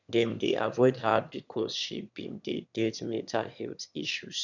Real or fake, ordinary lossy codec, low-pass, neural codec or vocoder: fake; none; 7.2 kHz; autoencoder, 22.05 kHz, a latent of 192 numbers a frame, VITS, trained on one speaker